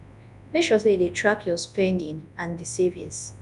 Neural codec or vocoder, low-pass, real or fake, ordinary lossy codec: codec, 24 kHz, 0.9 kbps, WavTokenizer, large speech release; 10.8 kHz; fake; none